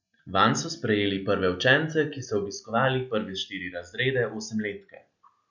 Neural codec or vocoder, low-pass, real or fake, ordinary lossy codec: none; 7.2 kHz; real; none